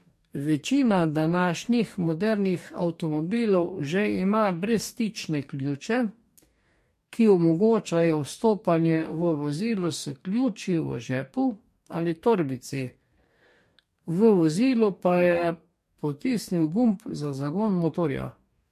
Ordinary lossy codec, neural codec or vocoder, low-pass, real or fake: MP3, 64 kbps; codec, 44.1 kHz, 2.6 kbps, DAC; 14.4 kHz; fake